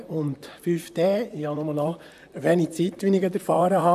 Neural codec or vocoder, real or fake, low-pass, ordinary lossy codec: vocoder, 44.1 kHz, 128 mel bands, Pupu-Vocoder; fake; 14.4 kHz; AAC, 96 kbps